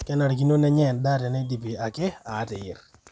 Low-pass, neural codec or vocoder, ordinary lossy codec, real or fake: none; none; none; real